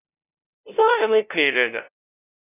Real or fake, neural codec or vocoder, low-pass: fake; codec, 16 kHz, 0.5 kbps, FunCodec, trained on LibriTTS, 25 frames a second; 3.6 kHz